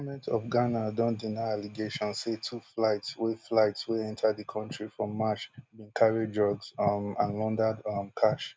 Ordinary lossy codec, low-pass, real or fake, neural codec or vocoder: none; none; real; none